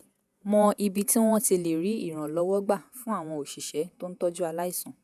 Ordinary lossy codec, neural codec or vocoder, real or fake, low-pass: none; vocoder, 48 kHz, 128 mel bands, Vocos; fake; 14.4 kHz